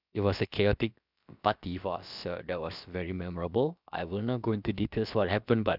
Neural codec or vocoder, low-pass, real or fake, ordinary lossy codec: codec, 16 kHz, about 1 kbps, DyCAST, with the encoder's durations; 5.4 kHz; fake; none